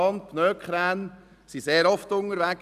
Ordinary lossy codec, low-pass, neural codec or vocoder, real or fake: none; 14.4 kHz; none; real